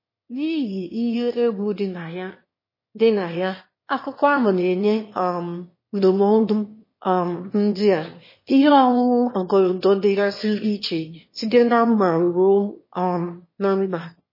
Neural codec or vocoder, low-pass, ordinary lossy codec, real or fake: autoencoder, 22.05 kHz, a latent of 192 numbers a frame, VITS, trained on one speaker; 5.4 kHz; MP3, 24 kbps; fake